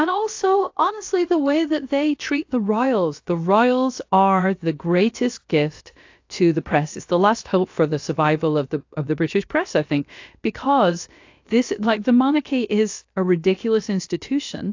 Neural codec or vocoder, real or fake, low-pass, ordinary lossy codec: codec, 16 kHz, about 1 kbps, DyCAST, with the encoder's durations; fake; 7.2 kHz; AAC, 48 kbps